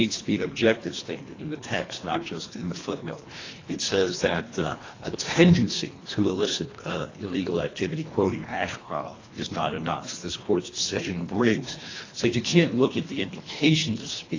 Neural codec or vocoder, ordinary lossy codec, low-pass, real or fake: codec, 24 kHz, 1.5 kbps, HILCodec; AAC, 32 kbps; 7.2 kHz; fake